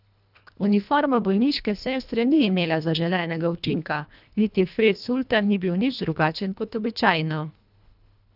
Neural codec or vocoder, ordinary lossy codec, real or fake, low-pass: codec, 24 kHz, 1.5 kbps, HILCodec; none; fake; 5.4 kHz